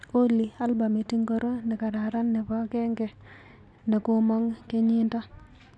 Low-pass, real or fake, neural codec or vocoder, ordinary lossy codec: none; real; none; none